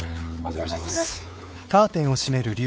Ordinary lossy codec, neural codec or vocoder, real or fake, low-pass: none; codec, 16 kHz, 4 kbps, X-Codec, WavLM features, trained on Multilingual LibriSpeech; fake; none